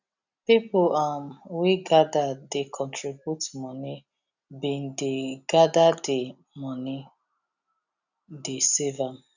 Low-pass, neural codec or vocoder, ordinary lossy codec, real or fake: 7.2 kHz; none; none; real